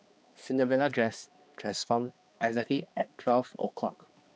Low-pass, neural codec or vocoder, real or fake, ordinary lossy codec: none; codec, 16 kHz, 2 kbps, X-Codec, HuBERT features, trained on balanced general audio; fake; none